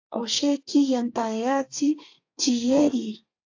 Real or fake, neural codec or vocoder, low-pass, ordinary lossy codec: fake; codec, 32 kHz, 1.9 kbps, SNAC; 7.2 kHz; AAC, 48 kbps